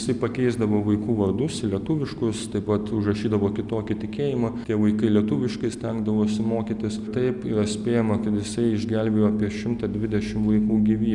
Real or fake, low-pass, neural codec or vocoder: real; 10.8 kHz; none